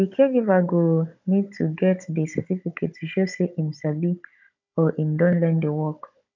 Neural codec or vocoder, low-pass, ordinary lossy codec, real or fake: codec, 16 kHz, 16 kbps, FunCodec, trained on Chinese and English, 50 frames a second; 7.2 kHz; none; fake